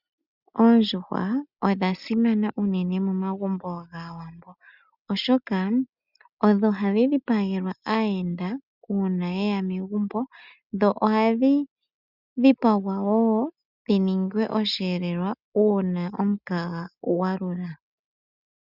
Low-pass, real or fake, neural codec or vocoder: 5.4 kHz; real; none